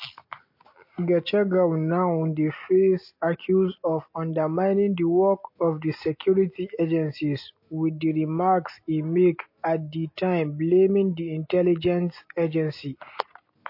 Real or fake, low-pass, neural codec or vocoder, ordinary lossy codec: real; 5.4 kHz; none; MP3, 32 kbps